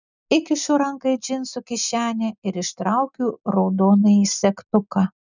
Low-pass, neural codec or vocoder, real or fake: 7.2 kHz; none; real